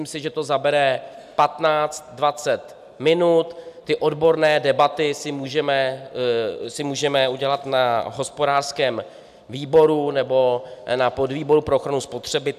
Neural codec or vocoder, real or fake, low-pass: none; real; 14.4 kHz